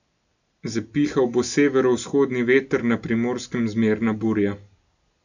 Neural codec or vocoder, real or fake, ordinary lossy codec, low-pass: none; real; none; 7.2 kHz